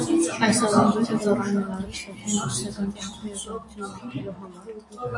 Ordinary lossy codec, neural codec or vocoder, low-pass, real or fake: AAC, 48 kbps; none; 10.8 kHz; real